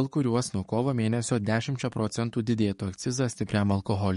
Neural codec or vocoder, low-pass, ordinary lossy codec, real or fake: codec, 44.1 kHz, 7.8 kbps, DAC; 19.8 kHz; MP3, 48 kbps; fake